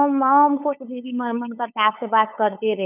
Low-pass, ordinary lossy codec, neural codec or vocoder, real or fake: 3.6 kHz; none; codec, 16 kHz, 8 kbps, FunCodec, trained on LibriTTS, 25 frames a second; fake